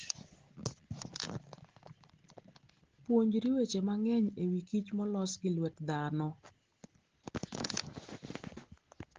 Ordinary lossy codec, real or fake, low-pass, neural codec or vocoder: Opus, 16 kbps; real; 7.2 kHz; none